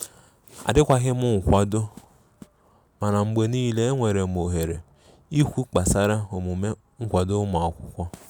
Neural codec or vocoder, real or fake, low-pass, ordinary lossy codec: none; real; none; none